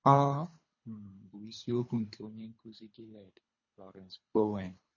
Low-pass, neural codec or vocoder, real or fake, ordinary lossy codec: 7.2 kHz; codec, 24 kHz, 3 kbps, HILCodec; fake; MP3, 32 kbps